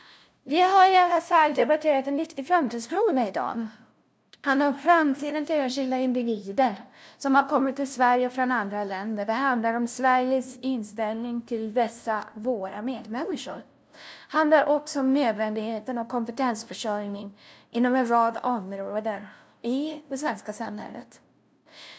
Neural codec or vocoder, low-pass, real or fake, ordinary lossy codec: codec, 16 kHz, 0.5 kbps, FunCodec, trained on LibriTTS, 25 frames a second; none; fake; none